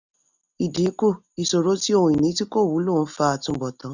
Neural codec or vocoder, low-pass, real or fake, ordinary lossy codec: none; 7.2 kHz; real; none